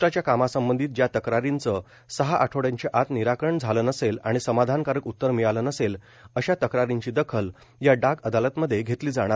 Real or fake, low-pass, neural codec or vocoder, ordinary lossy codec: real; none; none; none